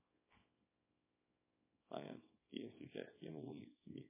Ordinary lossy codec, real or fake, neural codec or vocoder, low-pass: MP3, 16 kbps; fake; codec, 24 kHz, 0.9 kbps, WavTokenizer, small release; 3.6 kHz